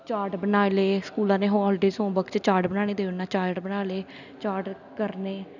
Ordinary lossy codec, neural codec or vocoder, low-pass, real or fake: none; none; 7.2 kHz; real